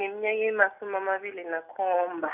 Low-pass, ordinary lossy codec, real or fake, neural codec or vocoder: 3.6 kHz; none; real; none